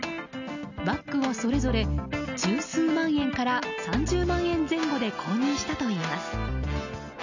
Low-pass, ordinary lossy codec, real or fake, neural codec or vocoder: 7.2 kHz; none; real; none